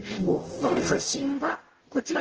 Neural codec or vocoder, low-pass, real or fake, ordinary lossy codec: codec, 44.1 kHz, 0.9 kbps, DAC; 7.2 kHz; fake; Opus, 16 kbps